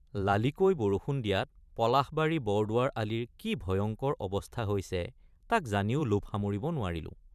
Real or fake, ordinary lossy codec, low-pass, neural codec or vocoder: fake; none; 14.4 kHz; vocoder, 44.1 kHz, 128 mel bands every 512 samples, BigVGAN v2